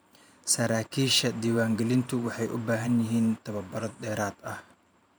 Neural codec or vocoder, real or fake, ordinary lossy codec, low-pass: vocoder, 44.1 kHz, 128 mel bands every 512 samples, BigVGAN v2; fake; none; none